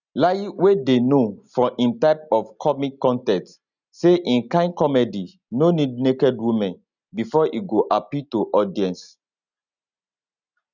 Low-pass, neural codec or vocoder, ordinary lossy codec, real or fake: 7.2 kHz; none; none; real